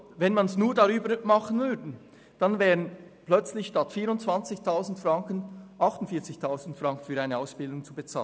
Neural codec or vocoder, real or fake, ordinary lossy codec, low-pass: none; real; none; none